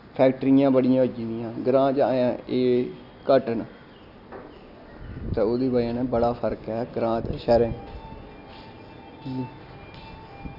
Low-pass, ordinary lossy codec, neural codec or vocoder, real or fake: 5.4 kHz; none; none; real